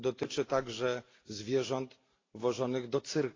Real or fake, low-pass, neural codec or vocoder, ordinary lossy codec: real; 7.2 kHz; none; AAC, 32 kbps